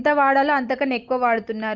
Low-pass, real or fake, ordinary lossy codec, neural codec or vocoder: 7.2 kHz; real; Opus, 24 kbps; none